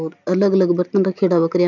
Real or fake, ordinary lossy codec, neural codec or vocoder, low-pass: real; none; none; 7.2 kHz